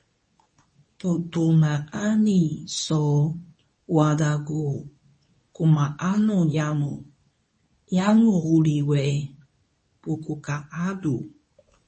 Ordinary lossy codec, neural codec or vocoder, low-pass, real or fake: MP3, 32 kbps; codec, 24 kHz, 0.9 kbps, WavTokenizer, medium speech release version 2; 10.8 kHz; fake